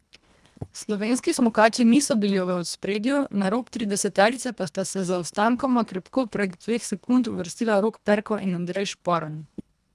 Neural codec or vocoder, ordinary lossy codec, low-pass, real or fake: codec, 24 kHz, 1.5 kbps, HILCodec; none; none; fake